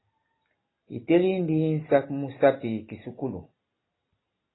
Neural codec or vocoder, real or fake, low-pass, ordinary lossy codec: none; real; 7.2 kHz; AAC, 16 kbps